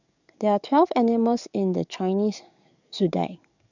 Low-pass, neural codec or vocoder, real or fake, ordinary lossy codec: 7.2 kHz; codec, 44.1 kHz, 7.8 kbps, DAC; fake; none